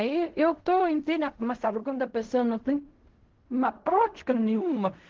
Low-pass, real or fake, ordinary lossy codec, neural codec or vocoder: 7.2 kHz; fake; Opus, 16 kbps; codec, 16 kHz in and 24 kHz out, 0.4 kbps, LongCat-Audio-Codec, fine tuned four codebook decoder